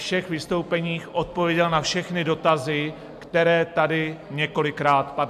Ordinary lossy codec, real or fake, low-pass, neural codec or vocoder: AAC, 96 kbps; real; 14.4 kHz; none